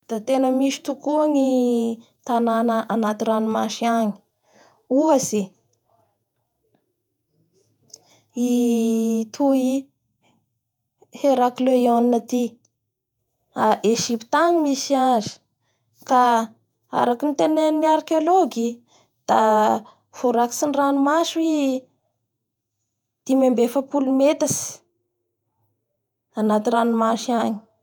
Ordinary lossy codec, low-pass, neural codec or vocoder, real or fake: none; 19.8 kHz; vocoder, 48 kHz, 128 mel bands, Vocos; fake